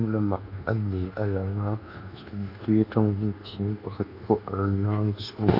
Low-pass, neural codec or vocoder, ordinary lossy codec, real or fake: 5.4 kHz; codec, 24 kHz, 1.2 kbps, DualCodec; none; fake